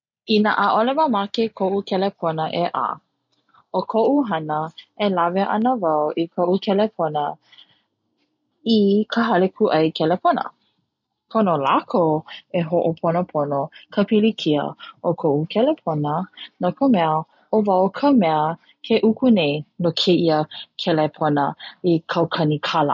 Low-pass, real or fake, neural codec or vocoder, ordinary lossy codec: 7.2 kHz; real; none; none